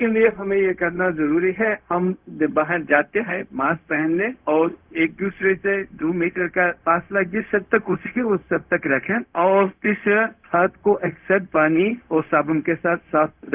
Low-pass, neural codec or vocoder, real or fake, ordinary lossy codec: 3.6 kHz; codec, 16 kHz, 0.4 kbps, LongCat-Audio-Codec; fake; Opus, 32 kbps